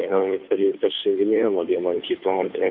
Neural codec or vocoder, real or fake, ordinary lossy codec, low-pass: codec, 16 kHz, 2 kbps, FunCodec, trained on Chinese and English, 25 frames a second; fake; MP3, 48 kbps; 5.4 kHz